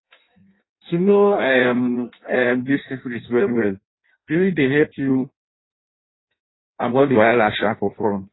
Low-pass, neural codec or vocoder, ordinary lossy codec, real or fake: 7.2 kHz; codec, 16 kHz in and 24 kHz out, 0.6 kbps, FireRedTTS-2 codec; AAC, 16 kbps; fake